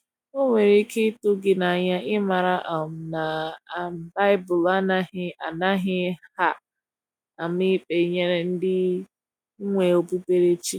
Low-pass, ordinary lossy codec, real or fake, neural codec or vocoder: 19.8 kHz; none; real; none